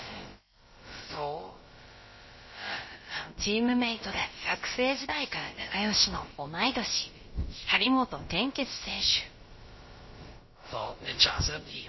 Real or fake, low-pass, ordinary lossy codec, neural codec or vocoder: fake; 7.2 kHz; MP3, 24 kbps; codec, 16 kHz, about 1 kbps, DyCAST, with the encoder's durations